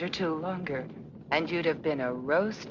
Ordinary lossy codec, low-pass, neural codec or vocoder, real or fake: Opus, 64 kbps; 7.2 kHz; none; real